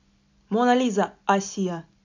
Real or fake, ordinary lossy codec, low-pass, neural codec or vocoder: real; none; 7.2 kHz; none